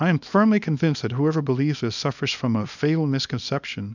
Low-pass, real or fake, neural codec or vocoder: 7.2 kHz; fake; codec, 24 kHz, 0.9 kbps, WavTokenizer, small release